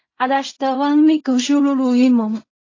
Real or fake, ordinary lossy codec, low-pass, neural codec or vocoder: fake; AAC, 32 kbps; 7.2 kHz; codec, 16 kHz in and 24 kHz out, 0.4 kbps, LongCat-Audio-Codec, fine tuned four codebook decoder